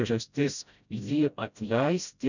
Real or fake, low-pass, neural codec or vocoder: fake; 7.2 kHz; codec, 16 kHz, 0.5 kbps, FreqCodec, smaller model